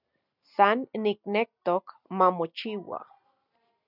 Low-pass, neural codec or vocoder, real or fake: 5.4 kHz; none; real